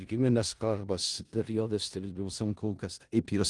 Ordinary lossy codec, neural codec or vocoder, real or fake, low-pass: Opus, 24 kbps; codec, 16 kHz in and 24 kHz out, 0.4 kbps, LongCat-Audio-Codec, four codebook decoder; fake; 10.8 kHz